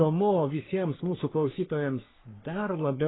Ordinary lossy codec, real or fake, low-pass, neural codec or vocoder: AAC, 16 kbps; fake; 7.2 kHz; codec, 44.1 kHz, 3.4 kbps, Pupu-Codec